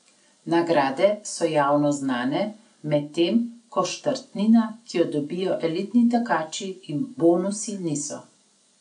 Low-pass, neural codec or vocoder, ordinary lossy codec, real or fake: 9.9 kHz; none; none; real